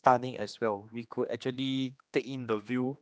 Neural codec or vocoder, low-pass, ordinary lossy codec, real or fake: codec, 16 kHz, 2 kbps, X-Codec, HuBERT features, trained on general audio; none; none; fake